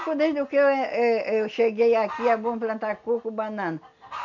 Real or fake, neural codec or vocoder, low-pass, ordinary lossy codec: real; none; 7.2 kHz; none